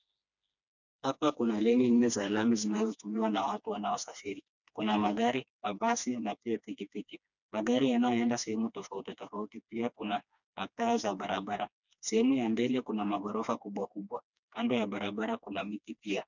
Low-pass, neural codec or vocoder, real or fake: 7.2 kHz; codec, 16 kHz, 2 kbps, FreqCodec, smaller model; fake